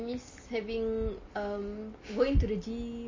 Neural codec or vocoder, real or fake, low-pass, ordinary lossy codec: none; real; 7.2 kHz; MP3, 32 kbps